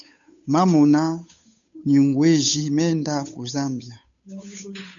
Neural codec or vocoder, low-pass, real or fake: codec, 16 kHz, 8 kbps, FunCodec, trained on Chinese and English, 25 frames a second; 7.2 kHz; fake